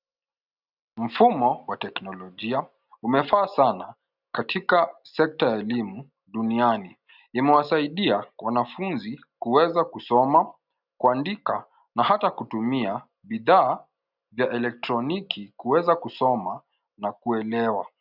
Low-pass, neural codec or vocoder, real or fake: 5.4 kHz; none; real